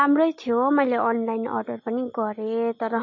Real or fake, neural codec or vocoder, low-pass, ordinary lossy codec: real; none; 7.2 kHz; MP3, 32 kbps